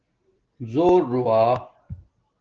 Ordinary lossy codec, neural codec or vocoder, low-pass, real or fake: Opus, 16 kbps; none; 7.2 kHz; real